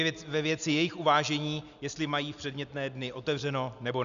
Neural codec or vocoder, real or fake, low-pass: none; real; 7.2 kHz